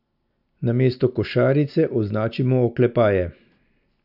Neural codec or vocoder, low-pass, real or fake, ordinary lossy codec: none; 5.4 kHz; real; none